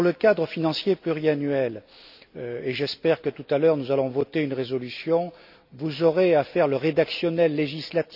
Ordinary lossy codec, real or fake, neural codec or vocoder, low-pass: none; real; none; 5.4 kHz